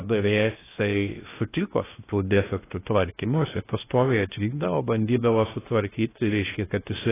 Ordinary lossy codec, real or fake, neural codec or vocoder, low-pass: AAC, 16 kbps; fake; codec, 16 kHz in and 24 kHz out, 0.6 kbps, FocalCodec, streaming, 2048 codes; 3.6 kHz